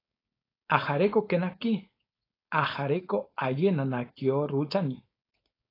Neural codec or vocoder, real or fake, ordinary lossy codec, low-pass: codec, 16 kHz, 4.8 kbps, FACodec; fake; AAC, 24 kbps; 5.4 kHz